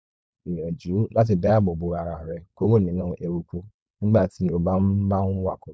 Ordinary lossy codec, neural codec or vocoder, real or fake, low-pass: none; codec, 16 kHz, 4.8 kbps, FACodec; fake; none